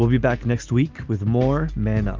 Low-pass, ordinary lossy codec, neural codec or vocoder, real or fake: 7.2 kHz; Opus, 24 kbps; none; real